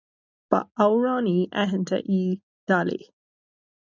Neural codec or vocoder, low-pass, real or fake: none; 7.2 kHz; real